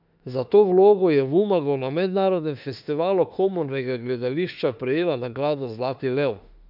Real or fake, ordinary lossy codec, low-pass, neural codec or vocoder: fake; none; 5.4 kHz; autoencoder, 48 kHz, 32 numbers a frame, DAC-VAE, trained on Japanese speech